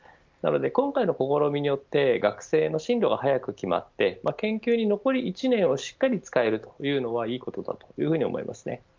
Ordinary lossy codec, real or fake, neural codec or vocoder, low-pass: Opus, 24 kbps; real; none; 7.2 kHz